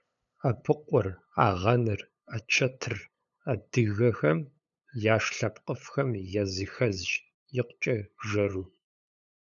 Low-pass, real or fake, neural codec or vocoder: 7.2 kHz; fake; codec, 16 kHz, 8 kbps, FunCodec, trained on LibriTTS, 25 frames a second